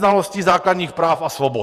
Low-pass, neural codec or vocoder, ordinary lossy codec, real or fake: 14.4 kHz; vocoder, 48 kHz, 128 mel bands, Vocos; Opus, 64 kbps; fake